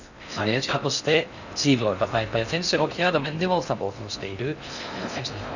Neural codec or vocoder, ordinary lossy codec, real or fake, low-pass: codec, 16 kHz in and 24 kHz out, 0.6 kbps, FocalCodec, streaming, 4096 codes; none; fake; 7.2 kHz